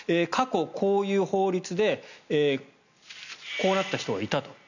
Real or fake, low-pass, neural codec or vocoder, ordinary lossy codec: real; 7.2 kHz; none; none